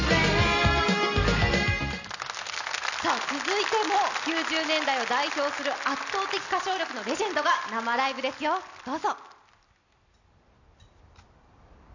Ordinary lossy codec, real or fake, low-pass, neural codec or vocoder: none; real; 7.2 kHz; none